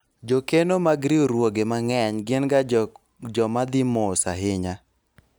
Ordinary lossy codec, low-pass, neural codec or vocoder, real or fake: none; none; none; real